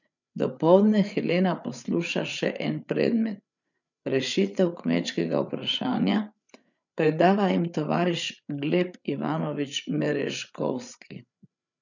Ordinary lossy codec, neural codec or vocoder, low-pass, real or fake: none; codec, 16 kHz, 8 kbps, FreqCodec, larger model; 7.2 kHz; fake